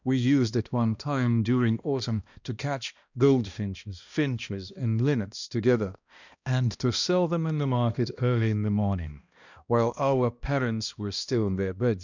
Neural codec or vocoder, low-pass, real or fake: codec, 16 kHz, 1 kbps, X-Codec, HuBERT features, trained on balanced general audio; 7.2 kHz; fake